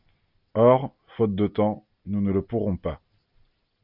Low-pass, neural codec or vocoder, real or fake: 5.4 kHz; none; real